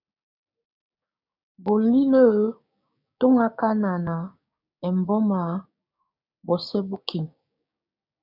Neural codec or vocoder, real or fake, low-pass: codec, 16 kHz, 6 kbps, DAC; fake; 5.4 kHz